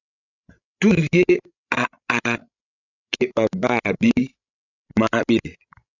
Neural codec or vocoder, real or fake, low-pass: codec, 16 kHz, 16 kbps, FreqCodec, larger model; fake; 7.2 kHz